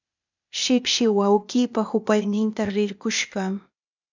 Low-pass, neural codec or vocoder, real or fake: 7.2 kHz; codec, 16 kHz, 0.8 kbps, ZipCodec; fake